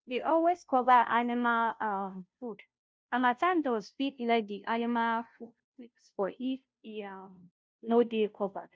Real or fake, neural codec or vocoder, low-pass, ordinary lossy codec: fake; codec, 16 kHz, 0.5 kbps, FunCodec, trained on Chinese and English, 25 frames a second; none; none